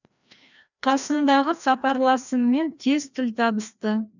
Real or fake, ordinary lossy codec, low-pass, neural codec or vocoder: fake; none; 7.2 kHz; codec, 16 kHz, 1 kbps, FreqCodec, larger model